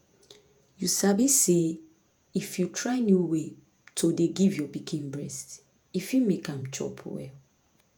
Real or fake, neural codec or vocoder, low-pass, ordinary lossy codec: fake; vocoder, 48 kHz, 128 mel bands, Vocos; 19.8 kHz; none